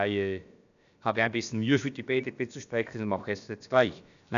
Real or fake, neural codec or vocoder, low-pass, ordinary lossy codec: fake; codec, 16 kHz, about 1 kbps, DyCAST, with the encoder's durations; 7.2 kHz; none